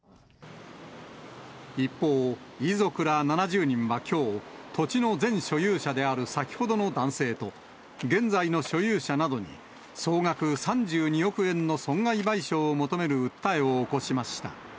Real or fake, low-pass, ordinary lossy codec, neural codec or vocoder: real; none; none; none